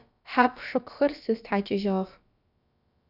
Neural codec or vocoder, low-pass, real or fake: codec, 16 kHz, about 1 kbps, DyCAST, with the encoder's durations; 5.4 kHz; fake